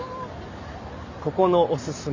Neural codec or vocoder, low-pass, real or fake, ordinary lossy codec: none; 7.2 kHz; real; MP3, 48 kbps